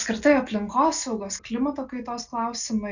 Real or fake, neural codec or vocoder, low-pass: real; none; 7.2 kHz